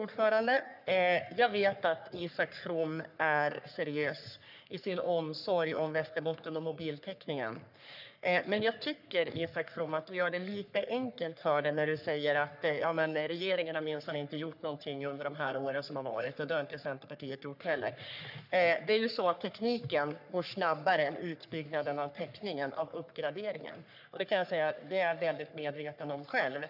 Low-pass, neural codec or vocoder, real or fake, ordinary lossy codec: 5.4 kHz; codec, 44.1 kHz, 3.4 kbps, Pupu-Codec; fake; none